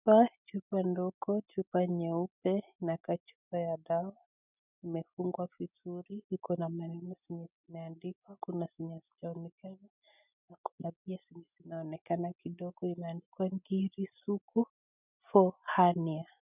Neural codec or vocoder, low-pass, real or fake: none; 3.6 kHz; real